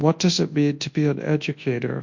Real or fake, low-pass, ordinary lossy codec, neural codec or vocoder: fake; 7.2 kHz; MP3, 48 kbps; codec, 24 kHz, 0.9 kbps, WavTokenizer, large speech release